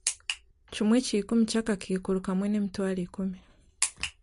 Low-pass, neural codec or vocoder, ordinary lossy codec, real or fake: 10.8 kHz; none; MP3, 48 kbps; real